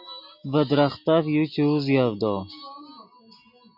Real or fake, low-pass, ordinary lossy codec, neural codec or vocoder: real; 5.4 kHz; MP3, 48 kbps; none